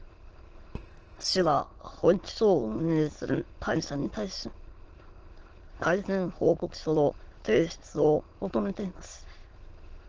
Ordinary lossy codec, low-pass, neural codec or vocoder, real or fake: Opus, 16 kbps; 7.2 kHz; autoencoder, 22.05 kHz, a latent of 192 numbers a frame, VITS, trained on many speakers; fake